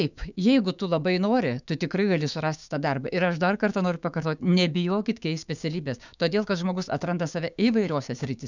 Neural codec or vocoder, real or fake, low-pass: codec, 16 kHz, 6 kbps, DAC; fake; 7.2 kHz